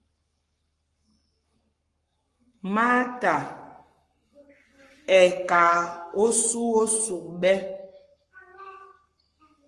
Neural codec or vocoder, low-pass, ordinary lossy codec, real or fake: codec, 44.1 kHz, 7.8 kbps, Pupu-Codec; 10.8 kHz; Opus, 32 kbps; fake